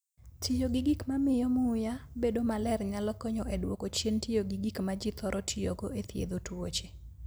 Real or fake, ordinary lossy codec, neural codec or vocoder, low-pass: real; none; none; none